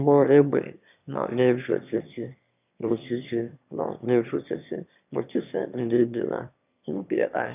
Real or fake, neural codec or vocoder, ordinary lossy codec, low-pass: fake; autoencoder, 22.05 kHz, a latent of 192 numbers a frame, VITS, trained on one speaker; none; 3.6 kHz